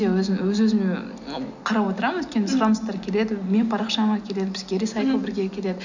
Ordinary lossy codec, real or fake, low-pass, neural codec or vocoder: none; real; 7.2 kHz; none